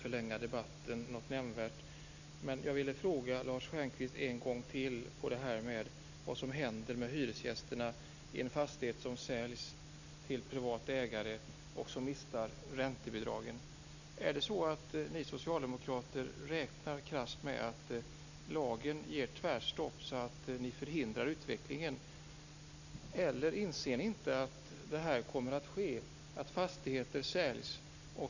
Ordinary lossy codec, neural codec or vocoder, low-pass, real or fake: none; none; 7.2 kHz; real